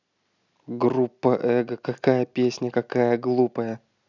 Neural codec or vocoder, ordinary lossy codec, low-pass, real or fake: none; none; 7.2 kHz; real